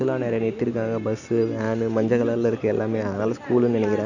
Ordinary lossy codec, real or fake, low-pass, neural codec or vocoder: none; real; 7.2 kHz; none